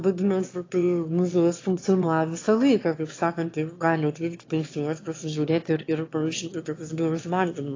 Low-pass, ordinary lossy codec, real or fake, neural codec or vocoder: 7.2 kHz; AAC, 32 kbps; fake; autoencoder, 22.05 kHz, a latent of 192 numbers a frame, VITS, trained on one speaker